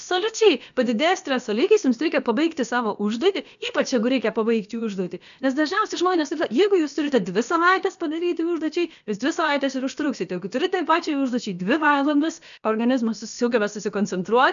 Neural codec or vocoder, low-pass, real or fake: codec, 16 kHz, about 1 kbps, DyCAST, with the encoder's durations; 7.2 kHz; fake